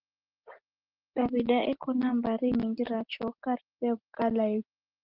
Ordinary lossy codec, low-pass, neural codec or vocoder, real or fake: Opus, 16 kbps; 5.4 kHz; none; real